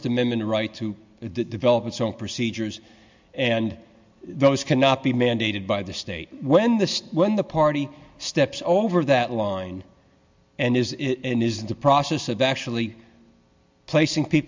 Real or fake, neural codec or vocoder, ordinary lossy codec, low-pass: real; none; MP3, 64 kbps; 7.2 kHz